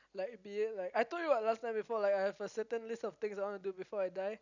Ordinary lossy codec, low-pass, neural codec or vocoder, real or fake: none; 7.2 kHz; none; real